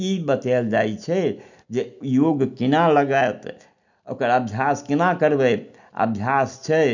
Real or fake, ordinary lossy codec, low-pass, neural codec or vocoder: real; none; 7.2 kHz; none